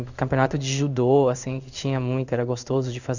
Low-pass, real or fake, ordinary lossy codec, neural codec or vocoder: 7.2 kHz; fake; none; codec, 16 kHz in and 24 kHz out, 1 kbps, XY-Tokenizer